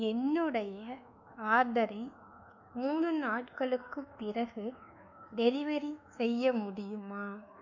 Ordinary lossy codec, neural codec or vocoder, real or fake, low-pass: none; codec, 24 kHz, 1.2 kbps, DualCodec; fake; 7.2 kHz